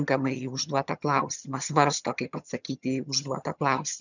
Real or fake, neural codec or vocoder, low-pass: fake; vocoder, 22.05 kHz, 80 mel bands, HiFi-GAN; 7.2 kHz